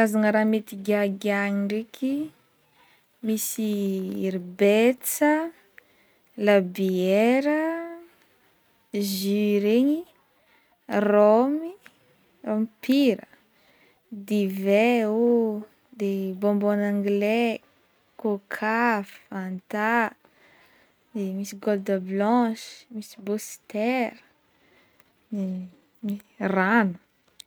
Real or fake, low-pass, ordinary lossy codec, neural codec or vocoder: real; none; none; none